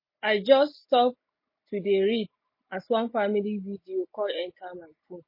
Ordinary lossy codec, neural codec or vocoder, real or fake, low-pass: MP3, 24 kbps; none; real; 5.4 kHz